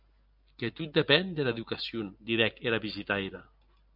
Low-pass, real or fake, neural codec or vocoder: 5.4 kHz; real; none